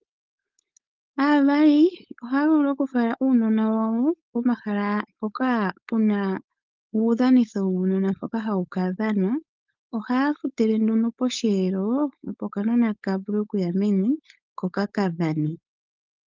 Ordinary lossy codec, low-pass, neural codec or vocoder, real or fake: Opus, 32 kbps; 7.2 kHz; codec, 16 kHz, 4.8 kbps, FACodec; fake